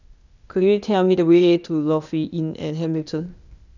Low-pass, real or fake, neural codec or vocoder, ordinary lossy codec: 7.2 kHz; fake; codec, 16 kHz, 0.8 kbps, ZipCodec; none